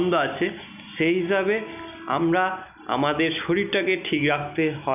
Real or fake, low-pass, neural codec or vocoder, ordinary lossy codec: real; 3.6 kHz; none; none